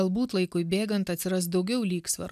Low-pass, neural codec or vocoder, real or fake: 14.4 kHz; none; real